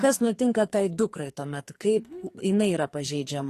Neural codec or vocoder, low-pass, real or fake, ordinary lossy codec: codec, 44.1 kHz, 2.6 kbps, SNAC; 14.4 kHz; fake; AAC, 48 kbps